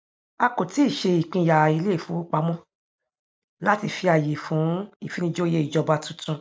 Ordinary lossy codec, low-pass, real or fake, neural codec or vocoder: none; none; real; none